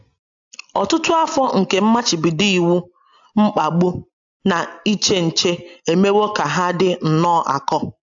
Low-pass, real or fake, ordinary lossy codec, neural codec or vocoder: 7.2 kHz; real; AAC, 64 kbps; none